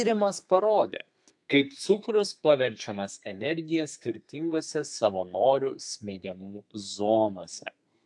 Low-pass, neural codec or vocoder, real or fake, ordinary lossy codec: 10.8 kHz; codec, 32 kHz, 1.9 kbps, SNAC; fake; AAC, 64 kbps